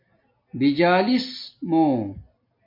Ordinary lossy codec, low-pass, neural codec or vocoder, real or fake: MP3, 32 kbps; 5.4 kHz; none; real